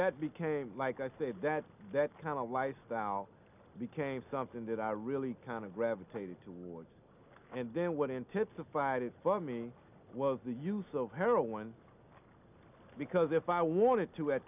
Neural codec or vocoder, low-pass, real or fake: none; 3.6 kHz; real